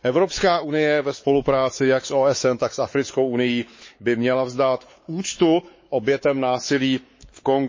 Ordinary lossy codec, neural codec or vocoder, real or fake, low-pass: MP3, 32 kbps; codec, 16 kHz, 4 kbps, X-Codec, WavLM features, trained on Multilingual LibriSpeech; fake; 7.2 kHz